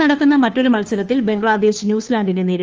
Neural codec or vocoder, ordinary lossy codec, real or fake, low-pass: codec, 16 kHz, 2 kbps, FunCodec, trained on Chinese and English, 25 frames a second; Opus, 24 kbps; fake; 7.2 kHz